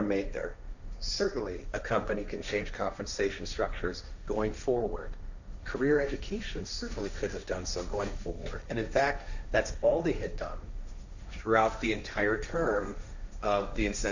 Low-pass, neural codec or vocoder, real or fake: 7.2 kHz; codec, 16 kHz, 1.1 kbps, Voila-Tokenizer; fake